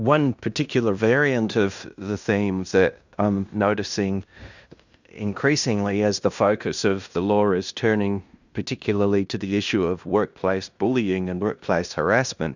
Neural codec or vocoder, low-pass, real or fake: codec, 16 kHz in and 24 kHz out, 0.9 kbps, LongCat-Audio-Codec, fine tuned four codebook decoder; 7.2 kHz; fake